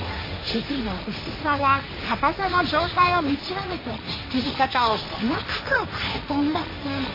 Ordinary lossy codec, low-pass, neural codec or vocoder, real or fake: MP3, 24 kbps; 5.4 kHz; codec, 16 kHz, 1.1 kbps, Voila-Tokenizer; fake